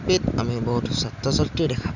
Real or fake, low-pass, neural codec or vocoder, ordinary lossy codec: real; 7.2 kHz; none; none